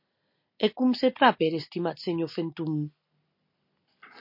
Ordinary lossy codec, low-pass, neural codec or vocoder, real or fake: MP3, 32 kbps; 5.4 kHz; none; real